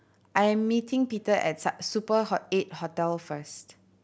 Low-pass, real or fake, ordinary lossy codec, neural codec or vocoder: none; real; none; none